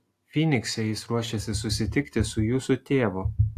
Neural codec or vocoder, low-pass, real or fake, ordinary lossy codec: none; 14.4 kHz; real; AAC, 64 kbps